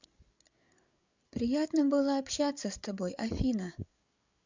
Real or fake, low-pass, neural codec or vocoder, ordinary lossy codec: fake; 7.2 kHz; codec, 16 kHz, 8 kbps, FreqCodec, larger model; Opus, 64 kbps